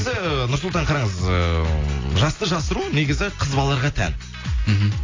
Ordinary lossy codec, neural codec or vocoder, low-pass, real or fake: AAC, 32 kbps; none; 7.2 kHz; real